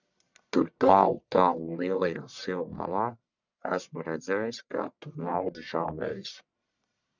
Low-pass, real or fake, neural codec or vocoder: 7.2 kHz; fake; codec, 44.1 kHz, 1.7 kbps, Pupu-Codec